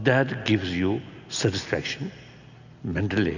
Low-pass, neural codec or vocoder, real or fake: 7.2 kHz; none; real